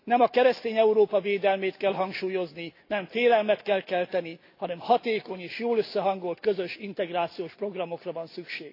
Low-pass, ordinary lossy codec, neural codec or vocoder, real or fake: 5.4 kHz; AAC, 32 kbps; none; real